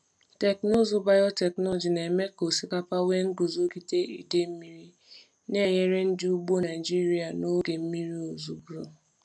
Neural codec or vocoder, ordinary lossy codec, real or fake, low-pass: none; none; real; none